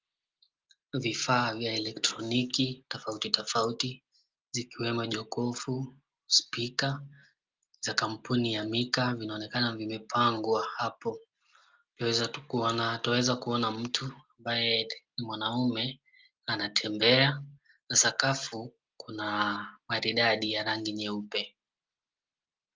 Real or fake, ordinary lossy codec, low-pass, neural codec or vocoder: real; Opus, 32 kbps; 7.2 kHz; none